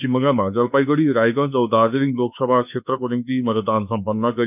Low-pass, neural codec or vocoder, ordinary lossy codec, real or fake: 3.6 kHz; autoencoder, 48 kHz, 32 numbers a frame, DAC-VAE, trained on Japanese speech; none; fake